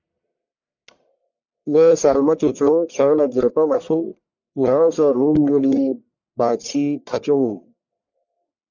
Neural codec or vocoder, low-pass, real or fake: codec, 44.1 kHz, 1.7 kbps, Pupu-Codec; 7.2 kHz; fake